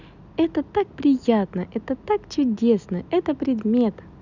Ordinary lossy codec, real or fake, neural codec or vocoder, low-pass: none; real; none; 7.2 kHz